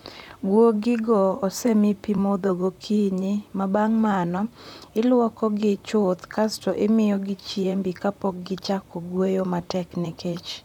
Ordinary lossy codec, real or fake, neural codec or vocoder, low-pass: none; fake; vocoder, 44.1 kHz, 128 mel bands, Pupu-Vocoder; 19.8 kHz